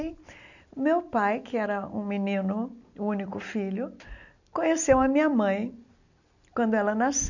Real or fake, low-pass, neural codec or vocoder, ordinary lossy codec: real; 7.2 kHz; none; none